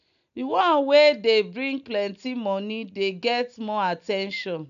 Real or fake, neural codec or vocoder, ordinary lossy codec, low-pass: real; none; none; 7.2 kHz